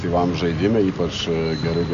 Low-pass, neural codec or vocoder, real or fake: 7.2 kHz; none; real